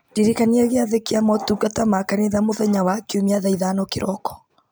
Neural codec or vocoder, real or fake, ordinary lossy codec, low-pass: none; real; none; none